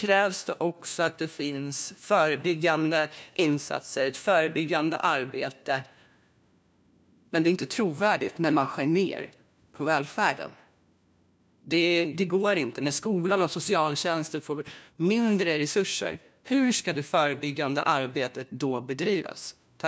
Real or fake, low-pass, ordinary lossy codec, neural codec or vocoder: fake; none; none; codec, 16 kHz, 1 kbps, FunCodec, trained on LibriTTS, 50 frames a second